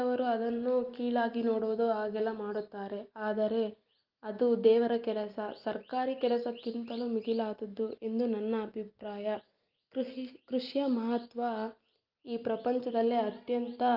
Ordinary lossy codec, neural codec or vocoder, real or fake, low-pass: Opus, 24 kbps; none; real; 5.4 kHz